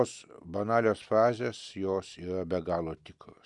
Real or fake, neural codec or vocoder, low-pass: real; none; 10.8 kHz